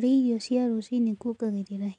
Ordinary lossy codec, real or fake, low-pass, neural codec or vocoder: none; real; 9.9 kHz; none